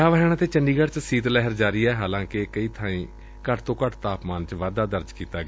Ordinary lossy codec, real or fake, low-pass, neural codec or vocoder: none; real; none; none